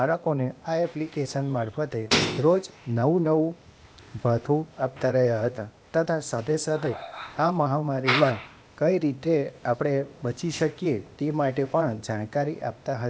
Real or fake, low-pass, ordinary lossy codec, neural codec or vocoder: fake; none; none; codec, 16 kHz, 0.8 kbps, ZipCodec